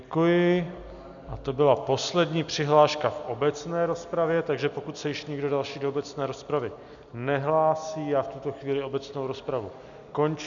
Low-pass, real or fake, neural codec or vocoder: 7.2 kHz; real; none